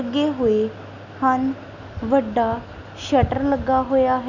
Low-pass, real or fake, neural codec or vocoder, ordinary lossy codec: 7.2 kHz; real; none; none